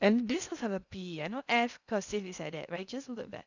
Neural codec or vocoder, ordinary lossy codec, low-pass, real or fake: codec, 16 kHz in and 24 kHz out, 0.6 kbps, FocalCodec, streaming, 2048 codes; none; 7.2 kHz; fake